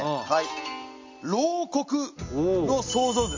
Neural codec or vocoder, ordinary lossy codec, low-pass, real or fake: none; MP3, 48 kbps; 7.2 kHz; real